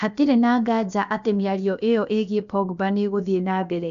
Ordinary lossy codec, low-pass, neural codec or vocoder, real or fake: AAC, 96 kbps; 7.2 kHz; codec, 16 kHz, about 1 kbps, DyCAST, with the encoder's durations; fake